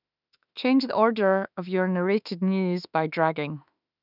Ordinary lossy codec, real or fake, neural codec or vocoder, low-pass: none; fake; autoencoder, 48 kHz, 32 numbers a frame, DAC-VAE, trained on Japanese speech; 5.4 kHz